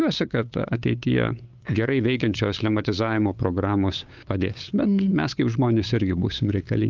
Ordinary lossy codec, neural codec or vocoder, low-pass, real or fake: Opus, 24 kbps; codec, 16 kHz, 8 kbps, FunCodec, trained on LibriTTS, 25 frames a second; 7.2 kHz; fake